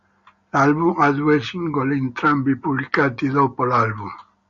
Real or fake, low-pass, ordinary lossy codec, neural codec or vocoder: real; 7.2 kHz; Opus, 64 kbps; none